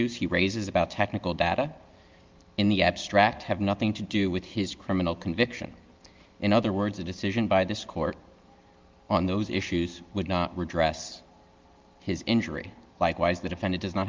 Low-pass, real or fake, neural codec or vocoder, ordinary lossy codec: 7.2 kHz; real; none; Opus, 32 kbps